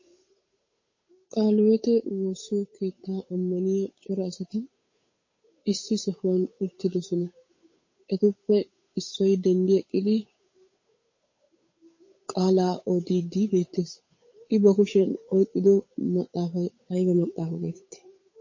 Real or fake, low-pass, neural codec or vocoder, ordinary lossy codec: fake; 7.2 kHz; codec, 16 kHz, 8 kbps, FunCodec, trained on Chinese and English, 25 frames a second; MP3, 32 kbps